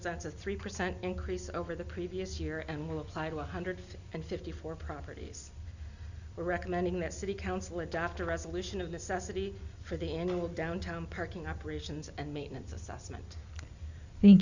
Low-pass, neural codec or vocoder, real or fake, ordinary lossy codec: 7.2 kHz; none; real; Opus, 64 kbps